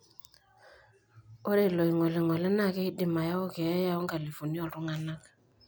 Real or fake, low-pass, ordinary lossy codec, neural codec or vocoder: real; none; none; none